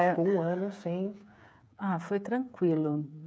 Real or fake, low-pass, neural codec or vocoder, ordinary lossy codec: fake; none; codec, 16 kHz, 8 kbps, FreqCodec, smaller model; none